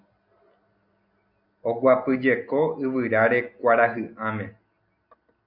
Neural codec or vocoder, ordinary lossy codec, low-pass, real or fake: none; MP3, 48 kbps; 5.4 kHz; real